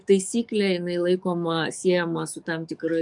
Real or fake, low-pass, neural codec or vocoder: fake; 10.8 kHz; codec, 44.1 kHz, 7.8 kbps, DAC